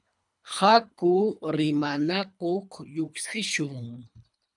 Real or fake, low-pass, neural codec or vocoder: fake; 10.8 kHz; codec, 24 kHz, 3 kbps, HILCodec